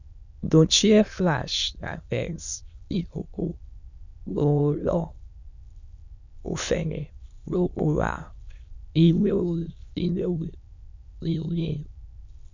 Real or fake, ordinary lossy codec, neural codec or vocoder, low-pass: fake; none; autoencoder, 22.05 kHz, a latent of 192 numbers a frame, VITS, trained on many speakers; 7.2 kHz